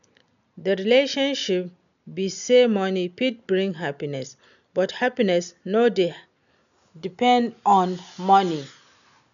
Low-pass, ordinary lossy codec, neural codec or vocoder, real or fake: 7.2 kHz; none; none; real